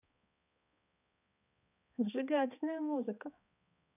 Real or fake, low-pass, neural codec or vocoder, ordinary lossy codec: fake; 3.6 kHz; codec, 16 kHz, 2 kbps, X-Codec, HuBERT features, trained on balanced general audio; none